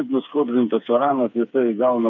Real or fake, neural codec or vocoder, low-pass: fake; codec, 16 kHz, 4 kbps, FreqCodec, smaller model; 7.2 kHz